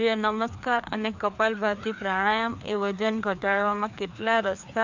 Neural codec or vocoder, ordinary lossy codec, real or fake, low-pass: codec, 16 kHz, 2 kbps, FreqCodec, larger model; MP3, 64 kbps; fake; 7.2 kHz